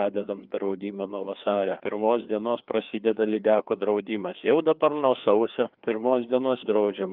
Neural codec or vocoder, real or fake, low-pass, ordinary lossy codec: codec, 16 kHz, 2 kbps, FreqCodec, larger model; fake; 5.4 kHz; Opus, 24 kbps